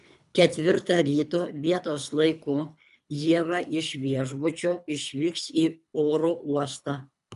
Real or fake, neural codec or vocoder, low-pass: fake; codec, 24 kHz, 3 kbps, HILCodec; 10.8 kHz